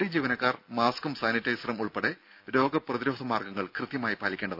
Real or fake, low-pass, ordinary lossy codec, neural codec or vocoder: real; 5.4 kHz; none; none